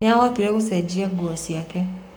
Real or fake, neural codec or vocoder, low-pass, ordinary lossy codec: fake; codec, 44.1 kHz, 7.8 kbps, Pupu-Codec; 19.8 kHz; none